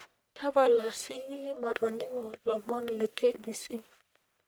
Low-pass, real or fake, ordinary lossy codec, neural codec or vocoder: none; fake; none; codec, 44.1 kHz, 1.7 kbps, Pupu-Codec